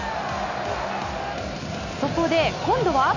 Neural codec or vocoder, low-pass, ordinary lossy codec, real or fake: none; 7.2 kHz; none; real